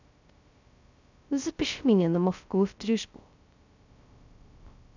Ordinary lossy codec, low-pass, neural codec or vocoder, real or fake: none; 7.2 kHz; codec, 16 kHz, 0.2 kbps, FocalCodec; fake